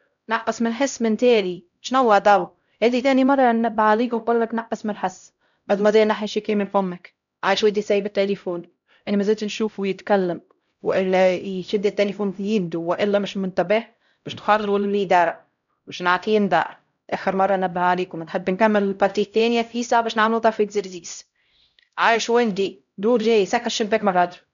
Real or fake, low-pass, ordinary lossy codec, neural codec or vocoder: fake; 7.2 kHz; none; codec, 16 kHz, 0.5 kbps, X-Codec, HuBERT features, trained on LibriSpeech